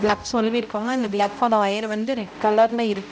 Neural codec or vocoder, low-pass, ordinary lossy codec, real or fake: codec, 16 kHz, 0.5 kbps, X-Codec, HuBERT features, trained on balanced general audio; none; none; fake